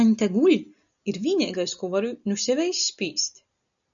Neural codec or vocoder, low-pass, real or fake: none; 7.2 kHz; real